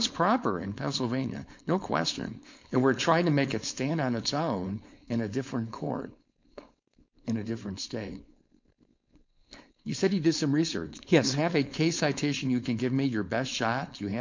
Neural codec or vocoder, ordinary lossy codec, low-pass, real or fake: codec, 16 kHz, 4.8 kbps, FACodec; MP3, 64 kbps; 7.2 kHz; fake